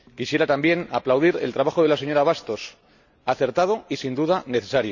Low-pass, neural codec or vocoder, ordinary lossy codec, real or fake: 7.2 kHz; none; none; real